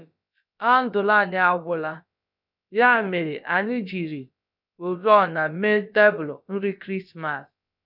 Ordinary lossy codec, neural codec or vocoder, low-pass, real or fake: none; codec, 16 kHz, about 1 kbps, DyCAST, with the encoder's durations; 5.4 kHz; fake